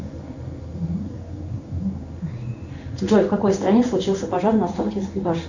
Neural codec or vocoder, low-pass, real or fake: codec, 16 kHz in and 24 kHz out, 1 kbps, XY-Tokenizer; 7.2 kHz; fake